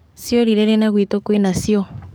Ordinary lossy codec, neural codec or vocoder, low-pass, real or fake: none; codec, 44.1 kHz, 7.8 kbps, DAC; none; fake